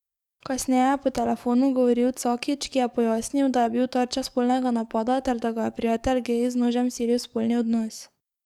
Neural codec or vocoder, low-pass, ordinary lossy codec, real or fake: codec, 44.1 kHz, 7.8 kbps, DAC; 19.8 kHz; none; fake